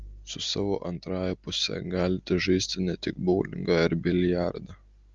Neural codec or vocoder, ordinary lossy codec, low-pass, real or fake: none; Opus, 32 kbps; 7.2 kHz; real